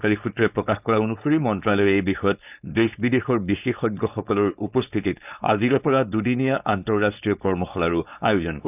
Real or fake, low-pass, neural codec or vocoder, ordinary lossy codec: fake; 3.6 kHz; codec, 16 kHz, 4.8 kbps, FACodec; none